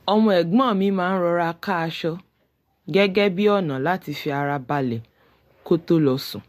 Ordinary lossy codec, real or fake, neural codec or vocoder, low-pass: MP3, 64 kbps; real; none; 14.4 kHz